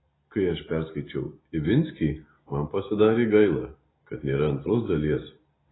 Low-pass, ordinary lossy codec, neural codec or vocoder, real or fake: 7.2 kHz; AAC, 16 kbps; none; real